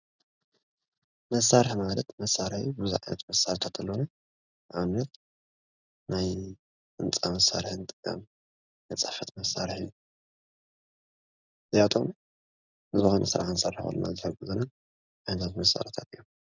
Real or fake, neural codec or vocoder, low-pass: real; none; 7.2 kHz